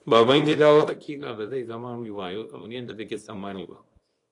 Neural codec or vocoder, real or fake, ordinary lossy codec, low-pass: codec, 24 kHz, 0.9 kbps, WavTokenizer, small release; fake; AAC, 48 kbps; 10.8 kHz